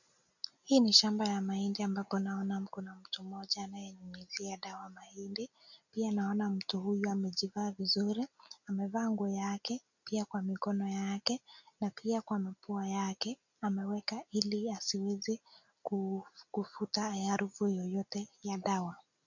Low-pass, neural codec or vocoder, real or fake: 7.2 kHz; none; real